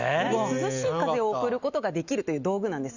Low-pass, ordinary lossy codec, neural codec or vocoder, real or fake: 7.2 kHz; Opus, 64 kbps; none; real